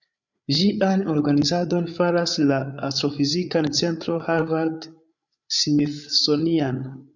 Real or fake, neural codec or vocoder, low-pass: fake; codec, 16 kHz, 8 kbps, FreqCodec, larger model; 7.2 kHz